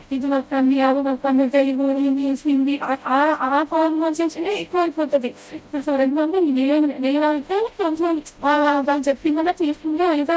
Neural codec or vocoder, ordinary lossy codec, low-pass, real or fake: codec, 16 kHz, 0.5 kbps, FreqCodec, smaller model; none; none; fake